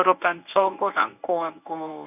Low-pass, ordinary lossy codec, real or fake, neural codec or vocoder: 3.6 kHz; none; fake; codec, 24 kHz, 0.9 kbps, WavTokenizer, medium speech release version 1